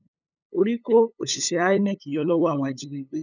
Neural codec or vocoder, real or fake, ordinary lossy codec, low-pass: codec, 16 kHz, 8 kbps, FunCodec, trained on LibriTTS, 25 frames a second; fake; none; 7.2 kHz